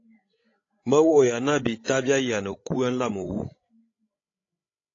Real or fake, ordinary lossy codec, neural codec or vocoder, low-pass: fake; AAC, 32 kbps; codec, 16 kHz, 16 kbps, FreqCodec, larger model; 7.2 kHz